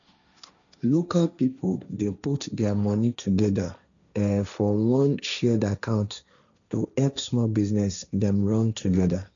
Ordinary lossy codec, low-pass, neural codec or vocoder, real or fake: none; 7.2 kHz; codec, 16 kHz, 1.1 kbps, Voila-Tokenizer; fake